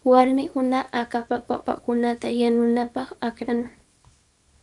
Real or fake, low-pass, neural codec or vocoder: fake; 10.8 kHz; codec, 24 kHz, 0.9 kbps, WavTokenizer, small release